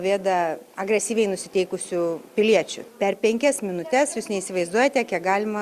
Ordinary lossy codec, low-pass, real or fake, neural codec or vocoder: Opus, 64 kbps; 14.4 kHz; real; none